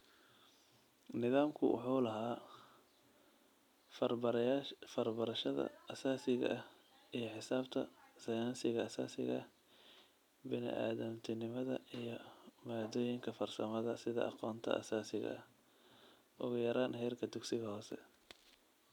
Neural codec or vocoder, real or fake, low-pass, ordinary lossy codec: none; real; 19.8 kHz; none